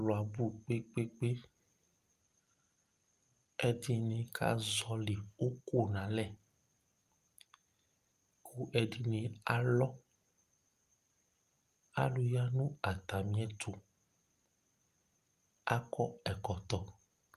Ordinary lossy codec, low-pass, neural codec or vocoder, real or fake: Opus, 32 kbps; 14.4 kHz; none; real